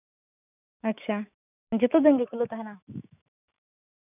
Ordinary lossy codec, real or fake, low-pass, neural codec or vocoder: none; fake; 3.6 kHz; autoencoder, 48 kHz, 128 numbers a frame, DAC-VAE, trained on Japanese speech